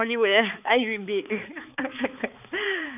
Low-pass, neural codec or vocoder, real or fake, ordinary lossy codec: 3.6 kHz; codec, 16 kHz, 4 kbps, X-Codec, HuBERT features, trained on balanced general audio; fake; none